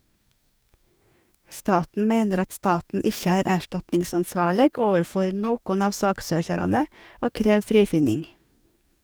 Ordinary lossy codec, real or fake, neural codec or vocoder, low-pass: none; fake; codec, 44.1 kHz, 2.6 kbps, DAC; none